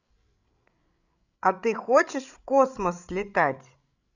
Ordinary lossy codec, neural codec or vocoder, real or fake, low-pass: none; codec, 16 kHz, 16 kbps, FreqCodec, larger model; fake; 7.2 kHz